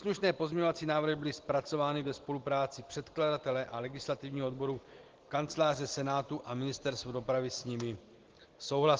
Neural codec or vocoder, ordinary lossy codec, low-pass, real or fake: none; Opus, 16 kbps; 7.2 kHz; real